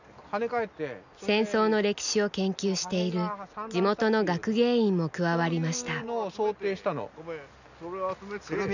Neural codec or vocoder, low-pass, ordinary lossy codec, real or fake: none; 7.2 kHz; none; real